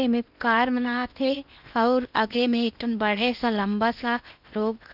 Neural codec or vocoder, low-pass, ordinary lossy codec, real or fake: codec, 16 kHz in and 24 kHz out, 0.8 kbps, FocalCodec, streaming, 65536 codes; 5.4 kHz; none; fake